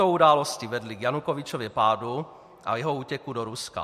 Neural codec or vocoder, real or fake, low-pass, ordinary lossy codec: none; real; 14.4 kHz; MP3, 64 kbps